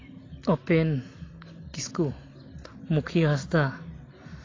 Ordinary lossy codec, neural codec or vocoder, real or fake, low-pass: AAC, 32 kbps; none; real; 7.2 kHz